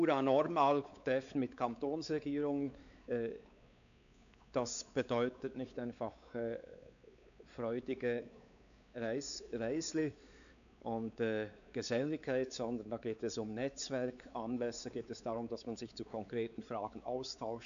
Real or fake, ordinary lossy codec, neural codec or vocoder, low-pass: fake; none; codec, 16 kHz, 4 kbps, X-Codec, WavLM features, trained on Multilingual LibriSpeech; 7.2 kHz